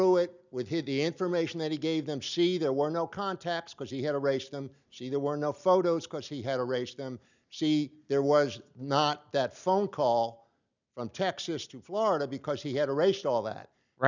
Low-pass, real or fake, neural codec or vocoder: 7.2 kHz; real; none